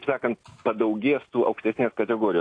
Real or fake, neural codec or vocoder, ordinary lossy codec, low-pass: real; none; AAC, 48 kbps; 9.9 kHz